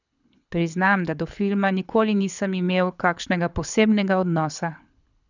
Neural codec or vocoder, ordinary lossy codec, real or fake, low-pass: codec, 24 kHz, 6 kbps, HILCodec; none; fake; 7.2 kHz